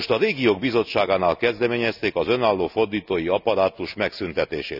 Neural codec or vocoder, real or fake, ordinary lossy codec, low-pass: none; real; none; 5.4 kHz